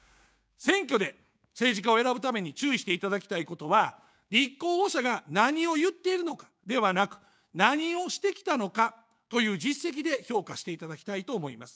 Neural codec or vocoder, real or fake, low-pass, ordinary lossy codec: codec, 16 kHz, 6 kbps, DAC; fake; none; none